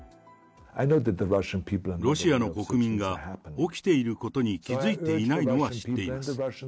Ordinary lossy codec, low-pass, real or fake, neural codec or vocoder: none; none; real; none